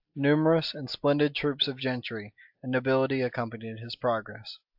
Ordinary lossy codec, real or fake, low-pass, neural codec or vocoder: AAC, 48 kbps; real; 5.4 kHz; none